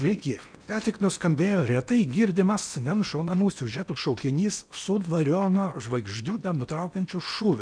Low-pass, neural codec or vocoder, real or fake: 9.9 kHz; codec, 16 kHz in and 24 kHz out, 0.8 kbps, FocalCodec, streaming, 65536 codes; fake